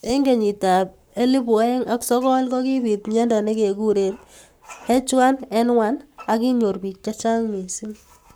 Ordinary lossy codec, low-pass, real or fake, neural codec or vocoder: none; none; fake; codec, 44.1 kHz, 7.8 kbps, Pupu-Codec